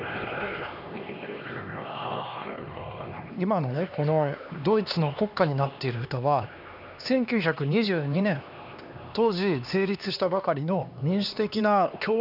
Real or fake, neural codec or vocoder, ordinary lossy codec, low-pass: fake; codec, 16 kHz, 2 kbps, X-Codec, HuBERT features, trained on LibriSpeech; none; 5.4 kHz